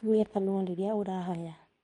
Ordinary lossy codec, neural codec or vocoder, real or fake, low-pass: MP3, 48 kbps; codec, 24 kHz, 0.9 kbps, WavTokenizer, medium speech release version 2; fake; 10.8 kHz